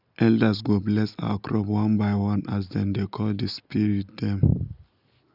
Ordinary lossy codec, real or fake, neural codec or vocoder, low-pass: none; real; none; 5.4 kHz